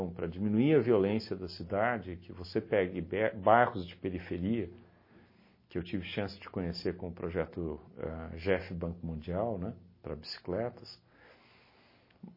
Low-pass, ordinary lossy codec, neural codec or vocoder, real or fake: 5.4 kHz; MP3, 24 kbps; none; real